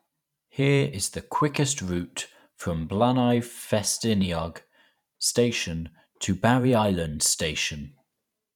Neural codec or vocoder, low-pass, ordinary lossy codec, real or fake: vocoder, 44.1 kHz, 128 mel bands every 512 samples, BigVGAN v2; 19.8 kHz; none; fake